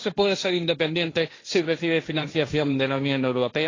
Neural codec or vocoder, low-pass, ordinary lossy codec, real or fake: codec, 16 kHz, 1.1 kbps, Voila-Tokenizer; 7.2 kHz; AAC, 32 kbps; fake